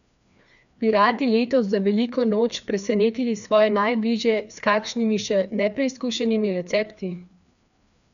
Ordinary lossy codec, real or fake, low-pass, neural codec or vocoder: none; fake; 7.2 kHz; codec, 16 kHz, 2 kbps, FreqCodec, larger model